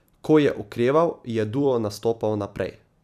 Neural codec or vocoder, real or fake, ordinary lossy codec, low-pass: vocoder, 44.1 kHz, 128 mel bands every 256 samples, BigVGAN v2; fake; none; 14.4 kHz